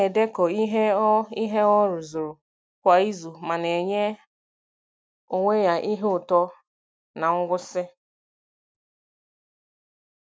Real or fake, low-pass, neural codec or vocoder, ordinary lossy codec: real; none; none; none